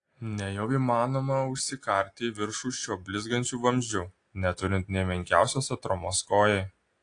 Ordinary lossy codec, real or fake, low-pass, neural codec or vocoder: AAC, 48 kbps; real; 9.9 kHz; none